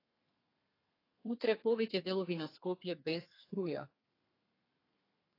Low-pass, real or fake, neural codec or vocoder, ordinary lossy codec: 5.4 kHz; fake; codec, 32 kHz, 1.9 kbps, SNAC; AAC, 24 kbps